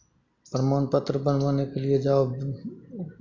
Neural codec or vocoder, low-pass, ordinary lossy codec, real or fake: none; 7.2 kHz; Opus, 64 kbps; real